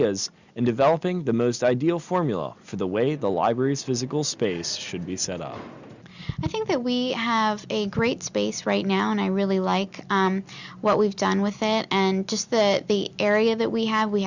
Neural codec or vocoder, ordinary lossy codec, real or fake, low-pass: none; Opus, 64 kbps; real; 7.2 kHz